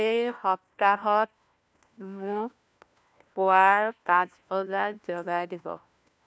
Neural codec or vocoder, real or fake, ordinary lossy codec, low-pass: codec, 16 kHz, 1 kbps, FunCodec, trained on LibriTTS, 50 frames a second; fake; none; none